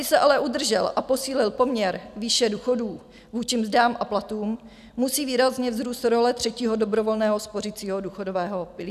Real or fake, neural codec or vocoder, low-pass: real; none; 14.4 kHz